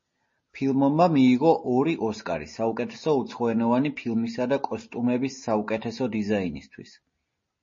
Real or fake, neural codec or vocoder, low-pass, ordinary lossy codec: real; none; 7.2 kHz; MP3, 32 kbps